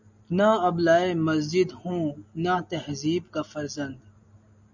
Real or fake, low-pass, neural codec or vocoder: real; 7.2 kHz; none